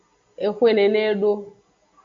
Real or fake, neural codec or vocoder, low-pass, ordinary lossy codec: real; none; 7.2 kHz; AAC, 48 kbps